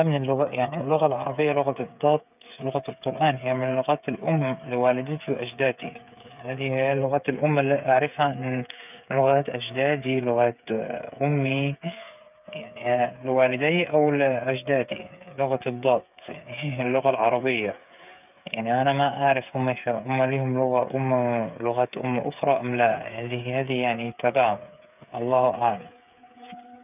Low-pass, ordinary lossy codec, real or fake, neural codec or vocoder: 3.6 kHz; none; fake; codec, 16 kHz, 8 kbps, FreqCodec, smaller model